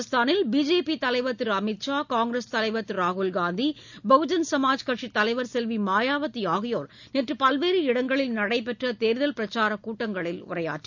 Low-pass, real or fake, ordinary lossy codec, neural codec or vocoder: 7.2 kHz; real; none; none